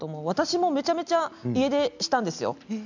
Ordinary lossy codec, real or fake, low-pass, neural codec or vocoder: none; real; 7.2 kHz; none